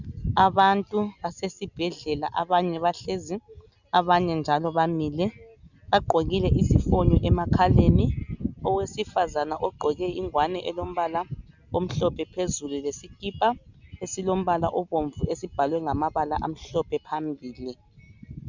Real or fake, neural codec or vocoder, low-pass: real; none; 7.2 kHz